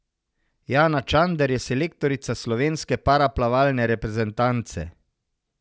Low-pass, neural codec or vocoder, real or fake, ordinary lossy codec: none; none; real; none